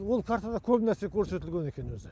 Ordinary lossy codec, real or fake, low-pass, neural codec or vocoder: none; real; none; none